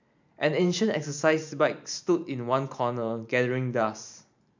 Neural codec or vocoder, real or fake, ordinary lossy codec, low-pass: vocoder, 44.1 kHz, 128 mel bands every 512 samples, BigVGAN v2; fake; MP3, 64 kbps; 7.2 kHz